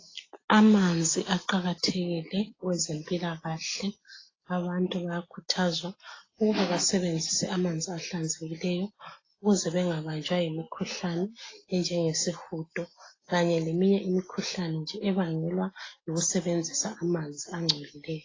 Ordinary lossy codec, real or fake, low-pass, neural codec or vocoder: AAC, 32 kbps; real; 7.2 kHz; none